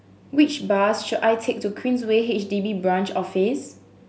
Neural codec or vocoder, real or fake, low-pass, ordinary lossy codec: none; real; none; none